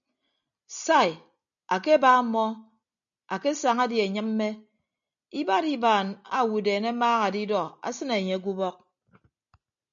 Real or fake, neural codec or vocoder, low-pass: real; none; 7.2 kHz